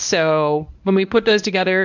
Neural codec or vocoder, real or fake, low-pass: codec, 24 kHz, 0.9 kbps, WavTokenizer, medium speech release version 1; fake; 7.2 kHz